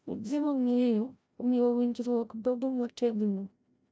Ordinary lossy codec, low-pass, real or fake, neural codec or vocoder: none; none; fake; codec, 16 kHz, 0.5 kbps, FreqCodec, larger model